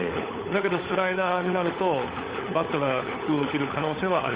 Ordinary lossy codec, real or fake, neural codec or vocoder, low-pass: Opus, 32 kbps; fake; codec, 16 kHz, 16 kbps, FunCodec, trained on LibriTTS, 50 frames a second; 3.6 kHz